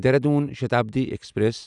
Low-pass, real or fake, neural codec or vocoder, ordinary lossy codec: 10.8 kHz; real; none; none